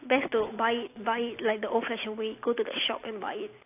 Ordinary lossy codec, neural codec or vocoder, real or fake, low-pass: AAC, 24 kbps; none; real; 3.6 kHz